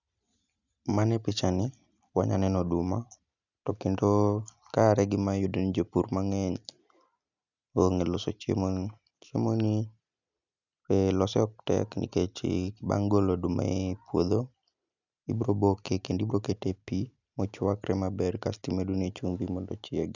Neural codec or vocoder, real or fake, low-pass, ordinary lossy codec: none; real; 7.2 kHz; none